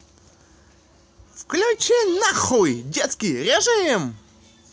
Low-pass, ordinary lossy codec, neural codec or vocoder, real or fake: none; none; none; real